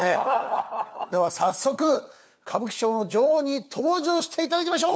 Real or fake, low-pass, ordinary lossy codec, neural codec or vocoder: fake; none; none; codec, 16 kHz, 4 kbps, FunCodec, trained on LibriTTS, 50 frames a second